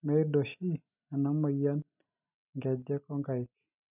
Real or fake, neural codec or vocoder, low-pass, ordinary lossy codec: real; none; 3.6 kHz; none